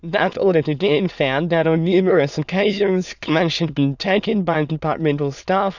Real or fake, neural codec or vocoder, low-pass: fake; autoencoder, 22.05 kHz, a latent of 192 numbers a frame, VITS, trained on many speakers; 7.2 kHz